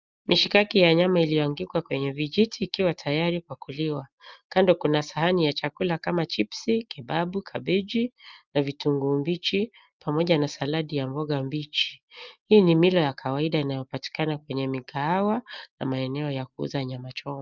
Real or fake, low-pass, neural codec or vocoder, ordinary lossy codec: real; 7.2 kHz; none; Opus, 24 kbps